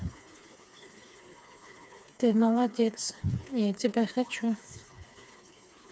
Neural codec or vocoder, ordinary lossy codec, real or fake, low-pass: codec, 16 kHz, 4 kbps, FreqCodec, smaller model; none; fake; none